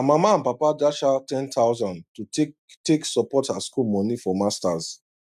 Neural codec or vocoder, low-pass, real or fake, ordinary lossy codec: none; 14.4 kHz; real; none